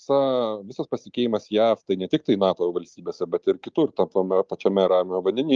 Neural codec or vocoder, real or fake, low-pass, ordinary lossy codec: none; real; 7.2 kHz; Opus, 32 kbps